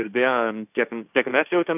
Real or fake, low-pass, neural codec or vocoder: fake; 3.6 kHz; codec, 16 kHz, 1.1 kbps, Voila-Tokenizer